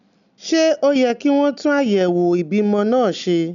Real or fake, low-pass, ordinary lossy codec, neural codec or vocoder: real; 7.2 kHz; none; none